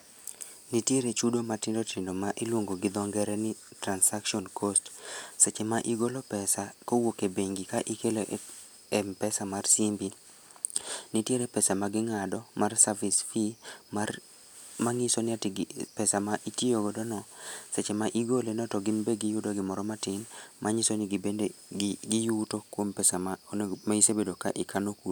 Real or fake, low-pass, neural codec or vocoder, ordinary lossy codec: real; none; none; none